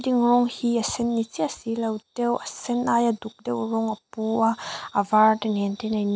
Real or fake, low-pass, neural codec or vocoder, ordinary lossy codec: real; none; none; none